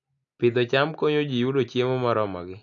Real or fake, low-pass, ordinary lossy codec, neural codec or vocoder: real; 7.2 kHz; AAC, 64 kbps; none